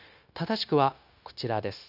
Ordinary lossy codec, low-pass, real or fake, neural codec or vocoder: none; 5.4 kHz; fake; codec, 16 kHz, 0.9 kbps, LongCat-Audio-Codec